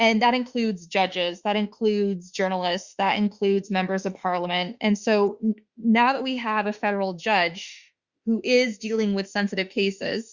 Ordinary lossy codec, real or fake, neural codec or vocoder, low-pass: Opus, 64 kbps; fake; autoencoder, 48 kHz, 32 numbers a frame, DAC-VAE, trained on Japanese speech; 7.2 kHz